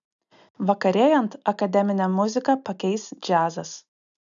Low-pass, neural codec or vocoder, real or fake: 7.2 kHz; none; real